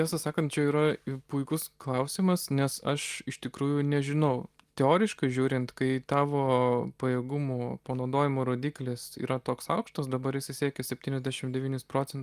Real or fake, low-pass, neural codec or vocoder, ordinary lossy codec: real; 14.4 kHz; none; Opus, 32 kbps